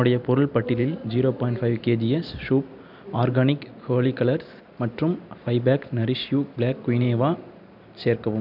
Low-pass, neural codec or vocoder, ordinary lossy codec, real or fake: 5.4 kHz; none; none; real